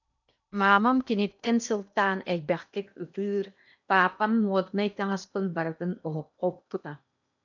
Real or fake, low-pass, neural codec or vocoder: fake; 7.2 kHz; codec, 16 kHz in and 24 kHz out, 0.8 kbps, FocalCodec, streaming, 65536 codes